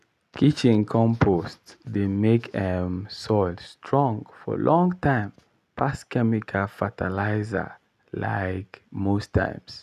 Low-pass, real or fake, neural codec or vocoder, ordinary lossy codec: 14.4 kHz; real; none; none